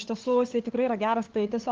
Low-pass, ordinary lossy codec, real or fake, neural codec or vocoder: 7.2 kHz; Opus, 16 kbps; fake; codec, 16 kHz, 8 kbps, FunCodec, trained on LibriTTS, 25 frames a second